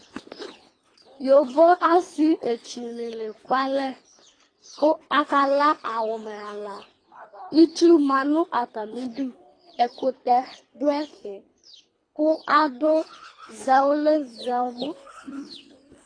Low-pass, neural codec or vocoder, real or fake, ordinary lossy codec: 9.9 kHz; codec, 24 kHz, 3 kbps, HILCodec; fake; AAC, 32 kbps